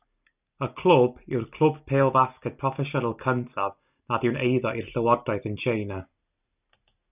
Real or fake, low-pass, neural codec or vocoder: real; 3.6 kHz; none